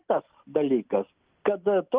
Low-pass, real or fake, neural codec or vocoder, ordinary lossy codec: 3.6 kHz; real; none; Opus, 32 kbps